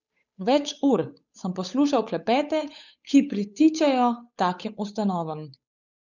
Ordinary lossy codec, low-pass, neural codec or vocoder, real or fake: none; 7.2 kHz; codec, 16 kHz, 8 kbps, FunCodec, trained on Chinese and English, 25 frames a second; fake